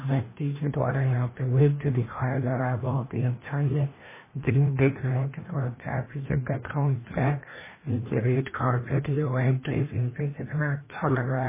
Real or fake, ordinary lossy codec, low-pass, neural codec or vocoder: fake; MP3, 16 kbps; 3.6 kHz; codec, 16 kHz, 1 kbps, FreqCodec, larger model